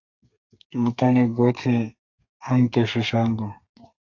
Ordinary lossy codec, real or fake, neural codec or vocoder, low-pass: AAC, 48 kbps; fake; codec, 32 kHz, 1.9 kbps, SNAC; 7.2 kHz